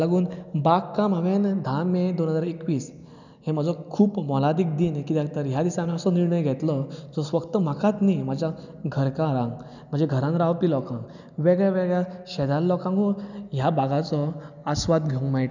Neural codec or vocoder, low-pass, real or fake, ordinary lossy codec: none; 7.2 kHz; real; none